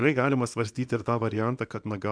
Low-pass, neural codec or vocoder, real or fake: 9.9 kHz; codec, 24 kHz, 0.9 kbps, WavTokenizer, small release; fake